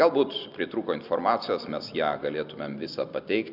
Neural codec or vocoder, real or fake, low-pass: none; real; 5.4 kHz